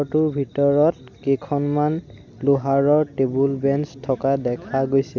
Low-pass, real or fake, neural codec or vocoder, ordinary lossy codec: 7.2 kHz; real; none; none